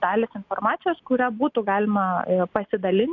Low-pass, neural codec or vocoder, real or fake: 7.2 kHz; none; real